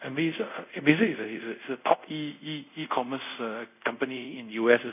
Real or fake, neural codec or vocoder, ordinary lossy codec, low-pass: fake; codec, 24 kHz, 0.5 kbps, DualCodec; none; 3.6 kHz